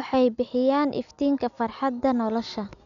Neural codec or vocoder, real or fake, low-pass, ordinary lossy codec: none; real; 7.2 kHz; none